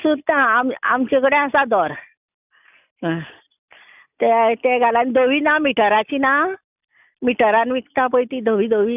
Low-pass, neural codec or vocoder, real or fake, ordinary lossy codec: 3.6 kHz; none; real; none